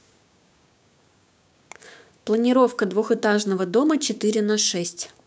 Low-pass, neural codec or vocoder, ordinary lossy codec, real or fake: none; codec, 16 kHz, 6 kbps, DAC; none; fake